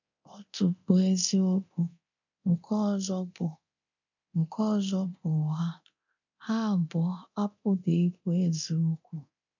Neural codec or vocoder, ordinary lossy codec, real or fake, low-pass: codec, 24 kHz, 0.9 kbps, DualCodec; none; fake; 7.2 kHz